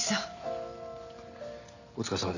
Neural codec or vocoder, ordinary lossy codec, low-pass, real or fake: none; none; 7.2 kHz; real